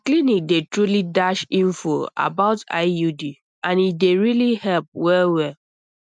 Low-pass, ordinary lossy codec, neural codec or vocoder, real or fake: 9.9 kHz; none; none; real